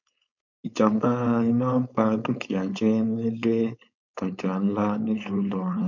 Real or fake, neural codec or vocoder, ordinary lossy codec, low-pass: fake; codec, 16 kHz, 4.8 kbps, FACodec; none; 7.2 kHz